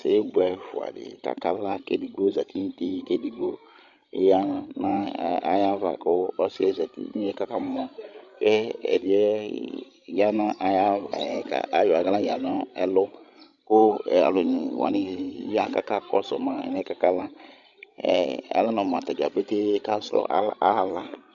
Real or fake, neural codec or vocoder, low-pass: fake; codec, 16 kHz, 16 kbps, FreqCodec, larger model; 7.2 kHz